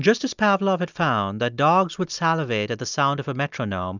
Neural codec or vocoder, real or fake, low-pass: none; real; 7.2 kHz